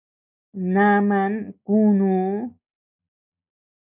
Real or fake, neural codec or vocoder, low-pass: real; none; 3.6 kHz